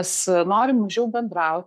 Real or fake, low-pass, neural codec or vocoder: fake; 14.4 kHz; codec, 44.1 kHz, 7.8 kbps, Pupu-Codec